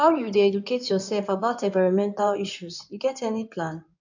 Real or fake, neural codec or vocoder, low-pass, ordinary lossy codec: fake; codec, 16 kHz in and 24 kHz out, 2.2 kbps, FireRedTTS-2 codec; 7.2 kHz; none